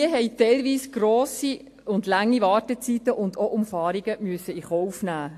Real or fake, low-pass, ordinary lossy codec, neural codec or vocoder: real; 14.4 kHz; AAC, 64 kbps; none